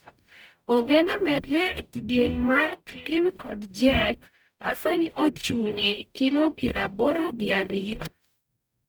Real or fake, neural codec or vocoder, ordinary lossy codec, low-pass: fake; codec, 44.1 kHz, 0.9 kbps, DAC; none; none